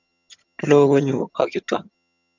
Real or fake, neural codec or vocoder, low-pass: fake; vocoder, 22.05 kHz, 80 mel bands, HiFi-GAN; 7.2 kHz